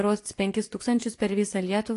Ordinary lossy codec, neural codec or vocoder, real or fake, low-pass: AAC, 48 kbps; vocoder, 24 kHz, 100 mel bands, Vocos; fake; 10.8 kHz